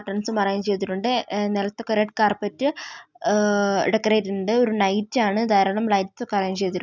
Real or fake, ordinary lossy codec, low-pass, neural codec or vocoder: real; none; 7.2 kHz; none